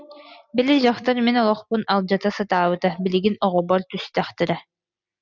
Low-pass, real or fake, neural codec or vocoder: 7.2 kHz; real; none